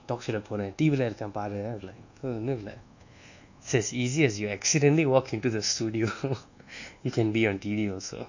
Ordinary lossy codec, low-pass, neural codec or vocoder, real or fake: none; 7.2 kHz; codec, 24 kHz, 1.2 kbps, DualCodec; fake